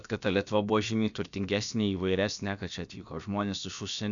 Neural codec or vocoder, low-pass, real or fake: codec, 16 kHz, about 1 kbps, DyCAST, with the encoder's durations; 7.2 kHz; fake